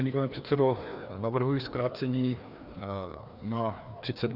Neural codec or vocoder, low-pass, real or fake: codec, 16 kHz, 2 kbps, FreqCodec, larger model; 5.4 kHz; fake